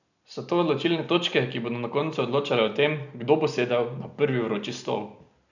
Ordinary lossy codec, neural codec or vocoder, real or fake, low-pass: none; none; real; 7.2 kHz